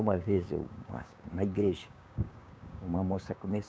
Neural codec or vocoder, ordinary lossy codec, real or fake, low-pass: codec, 16 kHz, 6 kbps, DAC; none; fake; none